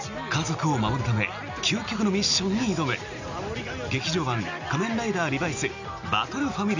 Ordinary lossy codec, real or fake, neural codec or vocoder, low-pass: none; real; none; 7.2 kHz